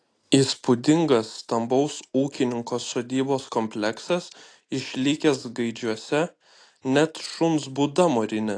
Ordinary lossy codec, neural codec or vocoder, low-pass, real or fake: AAC, 48 kbps; none; 9.9 kHz; real